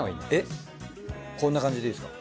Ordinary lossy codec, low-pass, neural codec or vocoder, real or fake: none; none; none; real